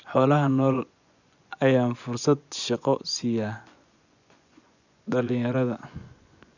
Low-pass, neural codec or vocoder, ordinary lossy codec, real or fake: 7.2 kHz; vocoder, 24 kHz, 100 mel bands, Vocos; none; fake